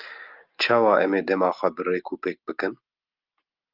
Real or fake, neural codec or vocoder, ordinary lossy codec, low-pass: real; none; Opus, 32 kbps; 5.4 kHz